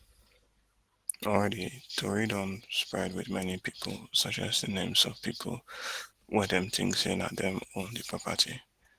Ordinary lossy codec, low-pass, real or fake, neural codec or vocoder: Opus, 16 kbps; 14.4 kHz; real; none